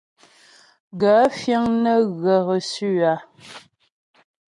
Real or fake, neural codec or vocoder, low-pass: real; none; 10.8 kHz